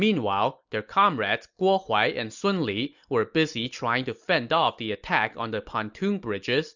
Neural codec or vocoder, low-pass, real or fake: none; 7.2 kHz; real